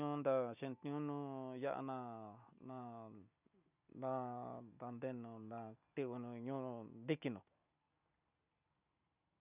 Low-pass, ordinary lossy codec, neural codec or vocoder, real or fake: 3.6 kHz; none; none; real